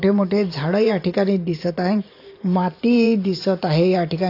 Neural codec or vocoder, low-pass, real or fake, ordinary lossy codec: vocoder, 44.1 kHz, 128 mel bands every 512 samples, BigVGAN v2; 5.4 kHz; fake; AAC, 32 kbps